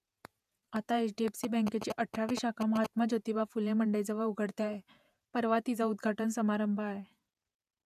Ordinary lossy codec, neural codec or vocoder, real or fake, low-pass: none; vocoder, 48 kHz, 128 mel bands, Vocos; fake; 14.4 kHz